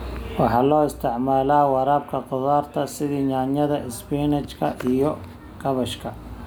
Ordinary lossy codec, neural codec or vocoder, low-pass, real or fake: none; none; none; real